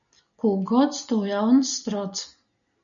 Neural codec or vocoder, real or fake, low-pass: none; real; 7.2 kHz